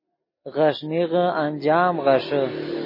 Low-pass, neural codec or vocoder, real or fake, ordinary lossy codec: 5.4 kHz; none; real; MP3, 24 kbps